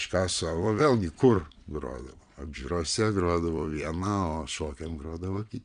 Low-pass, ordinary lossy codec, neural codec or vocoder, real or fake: 9.9 kHz; MP3, 96 kbps; vocoder, 22.05 kHz, 80 mel bands, Vocos; fake